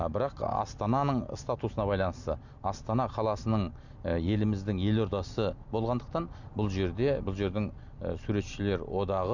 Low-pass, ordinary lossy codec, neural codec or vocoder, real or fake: 7.2 kHz; none; none; real